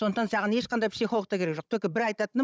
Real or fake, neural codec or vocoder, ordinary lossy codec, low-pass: fake; codec, 16 kHz, 16 kbps, FunCodec, trained on Chinese and English, 50 frames a second; none; none